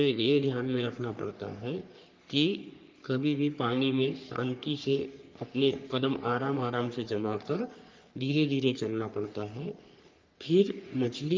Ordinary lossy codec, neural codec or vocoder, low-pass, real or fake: Opus, 24 kbps; codec, 44.1 kHz, 3.4 kbps, Pupu-Codec; 7.2 kHz; fake